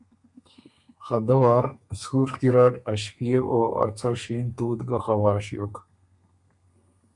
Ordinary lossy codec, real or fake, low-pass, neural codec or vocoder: MP3, 64 kbps; fake; 10.8 kHz; codec, 32 kHz, 1.9 kbps, SNAC